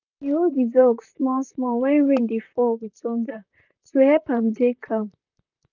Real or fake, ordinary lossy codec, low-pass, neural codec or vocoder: fake; none; 7.2 kHz; vocoder, 44.1 kHz, 128 mel bands, Pupu-Vocoder